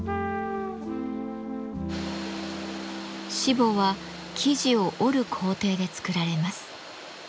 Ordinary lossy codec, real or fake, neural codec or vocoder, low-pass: none; real; none; none